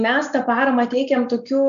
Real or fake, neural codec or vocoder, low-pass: real; none; 7.2 kHz